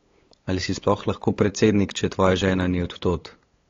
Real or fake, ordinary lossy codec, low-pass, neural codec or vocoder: fake; AAC, 32 kbps; 7.2 kHz; codec, 16 kHz, 8 kbps, FunCodec, trained on LibriTTS, 25 frames a second